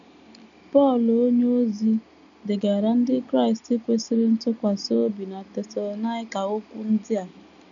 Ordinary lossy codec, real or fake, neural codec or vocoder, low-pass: none; real; none; 7.2 kHz